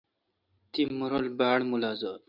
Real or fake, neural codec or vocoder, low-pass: real; none; 5.4 kHz